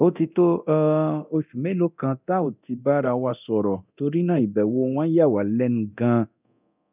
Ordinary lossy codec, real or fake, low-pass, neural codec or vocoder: none; fake; 3.6 kHz; codec, 24 kHz, 0.9 kbps, DualCodec